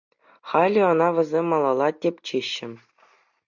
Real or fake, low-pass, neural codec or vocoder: real; 7.2 kHz; none